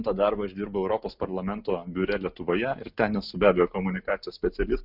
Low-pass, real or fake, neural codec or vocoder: 5.4 kHz; real; none